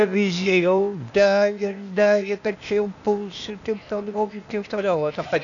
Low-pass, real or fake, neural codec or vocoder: 7.2 kHz; fake; codec, 16 kHz, 0.8 kbps, ZipCodec